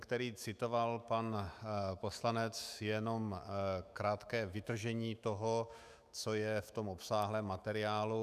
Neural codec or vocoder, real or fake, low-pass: autoencoder, 48 kHz, 128 numbers a frame, DAC-VAE, trained on Japanese speech; fake; 14.4 kHz